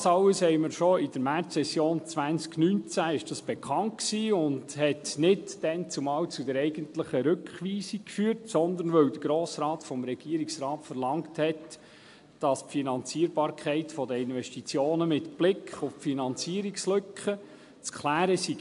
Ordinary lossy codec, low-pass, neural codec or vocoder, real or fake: AAC, 64 kbps; 10.8 kHz; none; real